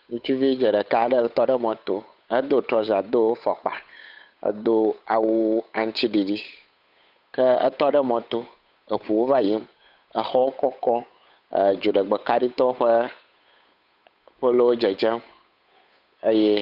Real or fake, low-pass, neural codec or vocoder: fake; 5.4 kHz; codec, 16 kHz, 8 kbps, FunCodec, trained on Chinese and English, 25 frames a second